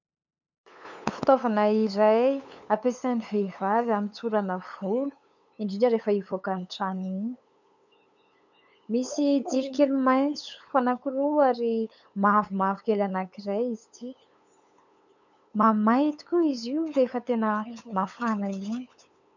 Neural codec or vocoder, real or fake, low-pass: codec, 16 kHz, 8 kbps, FunCodec, trained on LibriTTS, 25 frames a second; fake; 7.2 kHz